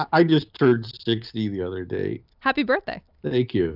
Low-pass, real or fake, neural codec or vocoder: 5.4 kHz; real; none